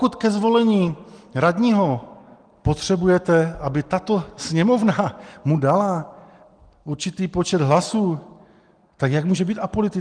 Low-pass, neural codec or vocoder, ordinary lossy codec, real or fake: 9.9 kHz; none; Opus, 24 kbps; real